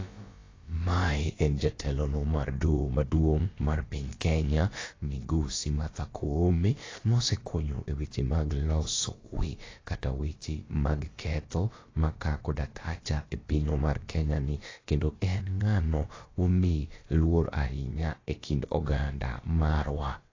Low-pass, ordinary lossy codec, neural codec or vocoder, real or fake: 7.2 kHz; AAC, 32 kbps; codec, 16 kHz, about 1 kbps, DyCAST, with the encoder's durations; fake